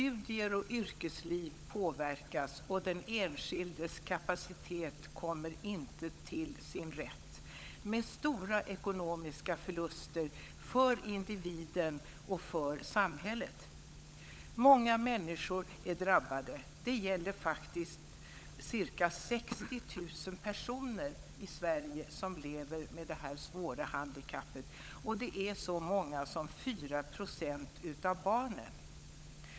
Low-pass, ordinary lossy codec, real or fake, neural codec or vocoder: none; none; fake; codec, 16 kHz, 16 kbps, FunCodec, trained on LibriTTS, 50 frames a second